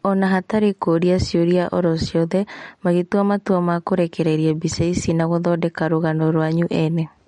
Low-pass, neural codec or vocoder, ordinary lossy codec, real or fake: 19.8 kHz; none; MP3, 48 kbps; real